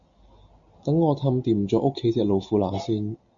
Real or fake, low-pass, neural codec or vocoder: real; 7.2 kHz; none